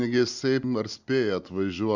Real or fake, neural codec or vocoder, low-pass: real; none; 7.2 kHz